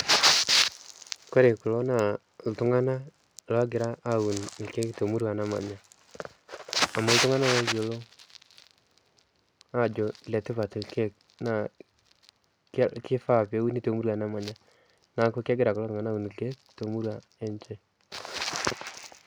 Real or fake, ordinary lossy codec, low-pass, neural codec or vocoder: real; none; none; none